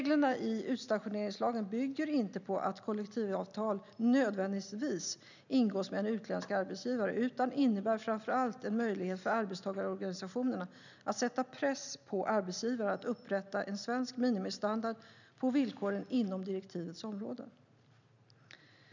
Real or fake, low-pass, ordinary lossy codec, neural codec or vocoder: real; 7.2 kHz; none; none